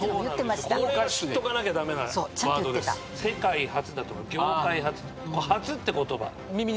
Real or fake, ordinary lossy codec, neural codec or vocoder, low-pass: real; none; none; none